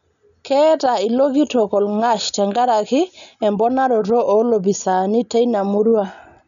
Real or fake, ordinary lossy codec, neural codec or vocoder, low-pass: real; none; none; 7.2 kHz